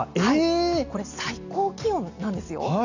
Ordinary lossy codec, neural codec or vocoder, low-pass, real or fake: none; none; 7.2 kHz; real